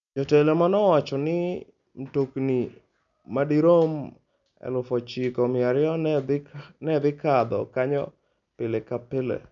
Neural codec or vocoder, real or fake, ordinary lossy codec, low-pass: none; real; none; 7.2 kHz